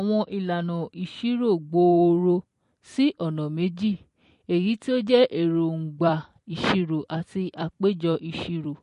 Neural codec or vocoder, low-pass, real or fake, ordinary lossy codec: vocoder, 44.1 kHz, 128 mel bands every 256 samples, BigVGAN v2; 14.4 kHz; fake; MP3, 48 kbps